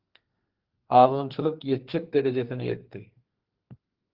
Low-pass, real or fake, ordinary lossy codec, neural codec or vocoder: 5.4 kHz; fake; Opus, 16 kbps; codec, 32 kHz, 1.9 kbps, SNAC